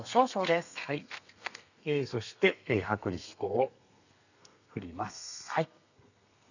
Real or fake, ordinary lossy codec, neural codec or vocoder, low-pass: fake; none; codec, 32 kHz, 1.9 kbps, SNAC; 7.2 kHz